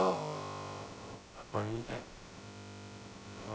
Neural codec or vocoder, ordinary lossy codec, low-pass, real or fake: codec, 16 kHz, about 1 kbps, DyCAST, with the encoder's durations; none; none; fake